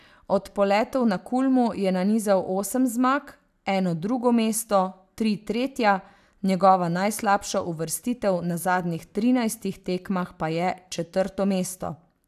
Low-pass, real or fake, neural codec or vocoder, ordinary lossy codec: 14.4 kHz; real; none; AAC, 96 kbps